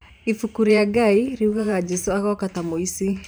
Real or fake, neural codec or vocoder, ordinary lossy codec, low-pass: fake; vocoder, 44.1 kHz, 128 mel bands every 512 samples, BigVGAN v2; none; none